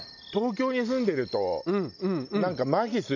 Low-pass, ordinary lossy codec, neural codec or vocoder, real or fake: 7.2 kHz; none; none; real